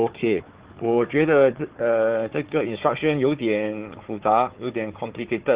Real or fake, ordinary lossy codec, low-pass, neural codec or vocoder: fake; Opus, 16 kbps; 3.6 kHz; codec, 16 kHz, 4 kbps, FunCodec, trained on Chinese and English, 50 frames a second